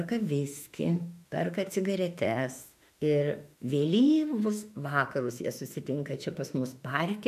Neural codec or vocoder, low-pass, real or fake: autoencoder, 48 kHz, 32 numbers a frame, DAC-VAE, trained on Japanese speech; 14.4 kHz; fake